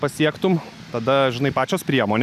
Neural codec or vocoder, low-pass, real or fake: none; 14.4 kHz; real